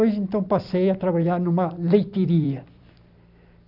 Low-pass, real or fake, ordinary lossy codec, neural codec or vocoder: 5.4 kHz; fake; none; vocoder, 44.1 kHz, 128 mel bands every 256 samples, BigVGAN v2